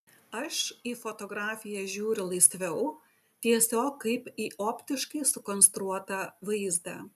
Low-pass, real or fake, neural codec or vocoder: 14.4 kHz; real; none